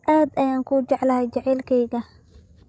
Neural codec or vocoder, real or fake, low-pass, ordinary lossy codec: codec, 16 kHz, 16 kbps, FreqCodec, larger model; fake; none; none